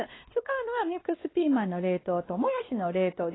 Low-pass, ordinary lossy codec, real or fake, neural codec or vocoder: 7.2 kHz; AAC, 16 kbps; fake; codec, 16 kHz, 2 kbps, X-Codec, WavLM features, trained on Multilingual LibriSpeech